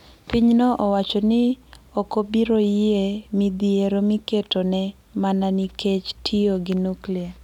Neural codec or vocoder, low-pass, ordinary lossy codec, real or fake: none; 19.8 kHz; none; real